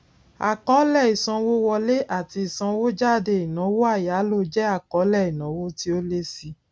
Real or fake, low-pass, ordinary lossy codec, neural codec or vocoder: real; none; none; none